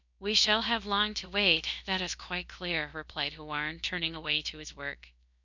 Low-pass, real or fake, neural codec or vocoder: 7.2 kHz; fake; codec, 16 kHz, about 1 kbps, DyCAST, with the encoder's durations